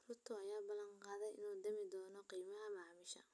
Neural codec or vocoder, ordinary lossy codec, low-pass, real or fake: none; none; none; real